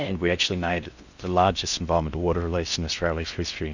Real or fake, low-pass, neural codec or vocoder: fake; 7.2 kHz; codec, 16 kHz in and 24 kHz out, 0.6 kbps, FocalCodec, streaming, 4096 codes